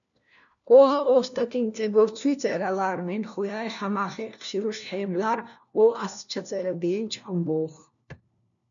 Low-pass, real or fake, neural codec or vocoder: 7.2 kHz; fake; codec, 16 kHz, 1 kbps, FunCodec, trained on LibriTTS, 50 frames a second